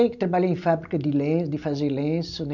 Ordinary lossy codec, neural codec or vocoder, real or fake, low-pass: none; none; real; 7.2 kHz